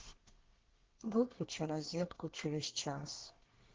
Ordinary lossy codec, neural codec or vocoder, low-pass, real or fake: Opus, 16 kbps; codec, 24 kHz, 1 kbps, SNAC; 7.2 kHz; fake